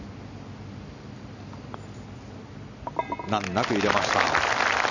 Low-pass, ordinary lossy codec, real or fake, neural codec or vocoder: 7.2 kHz; none; real; none